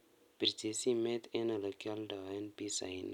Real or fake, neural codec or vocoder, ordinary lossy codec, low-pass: real; none; none; 19.8 kHz